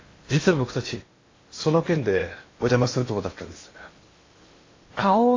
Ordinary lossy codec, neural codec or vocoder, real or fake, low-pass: AAC, 32 kbps; codec, 16 kHz in and 24 kHz out, 0.8 kbps, FocalCodec, streaming, 65536 codes; fake; 7.2 kHz